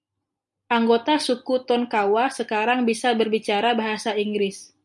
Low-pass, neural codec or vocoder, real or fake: 10.8 kHz; none; real